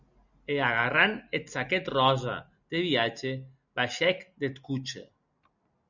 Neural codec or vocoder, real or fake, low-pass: none; real; 7.2 kHz